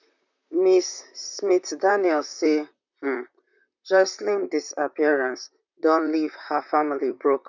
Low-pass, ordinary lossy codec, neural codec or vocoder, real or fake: 7.2 kHz; none; vocoder, 44.1 kHz, 128 mel bands, Pupu-Vocoder; fake